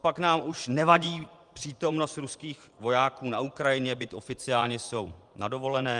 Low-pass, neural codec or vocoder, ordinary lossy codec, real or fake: 10.8 kHz; vocoder, 24 kHz, 100 mel bands, Vocos; Opus, 32 kbps; fake